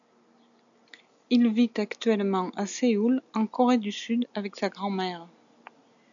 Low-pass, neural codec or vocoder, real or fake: 7.2 kHz; none; real